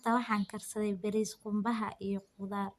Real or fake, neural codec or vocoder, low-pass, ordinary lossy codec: real; none; 14.4 kHz; Opus, 64 kbps